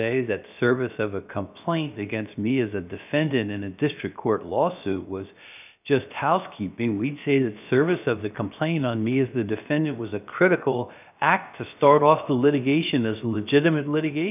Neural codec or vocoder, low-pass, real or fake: codec, 16 kHz, about 1 kbps, DyCAST, with the encoder's durations; 3.6 kHz; fake